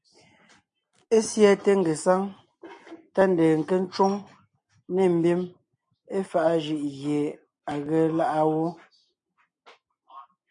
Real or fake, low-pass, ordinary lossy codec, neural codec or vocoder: real; 9.9 kHz; MP3, 48 kbps; none